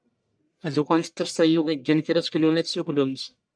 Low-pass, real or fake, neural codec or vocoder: 9.9 kHz; fake; codec, 44.1 kHz, 1.7 kbps, Pupu-Codec